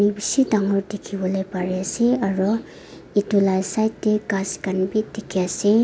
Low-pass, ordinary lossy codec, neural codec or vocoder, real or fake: none; none; none; real